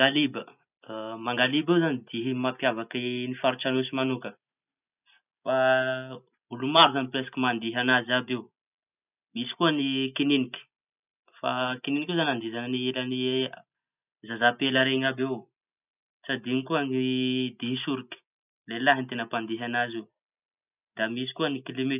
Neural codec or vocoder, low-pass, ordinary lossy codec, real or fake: none; 3.6 kHz; none; real